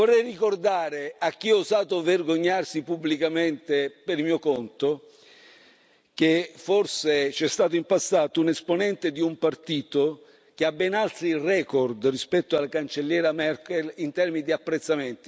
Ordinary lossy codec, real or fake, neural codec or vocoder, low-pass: none; real; none; none